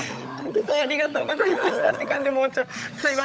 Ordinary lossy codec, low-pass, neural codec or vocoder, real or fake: none; none; codec, 16 kHz, 16 kbps, FunCodec, trained on LibriTTS, 50 frames a second; fake